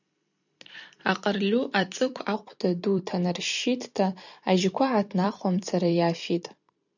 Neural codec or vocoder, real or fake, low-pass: vocoder, 44.1 kHz, 128 mel bands every 256 samples, BigVGAN v2; fake; 7.2 kHz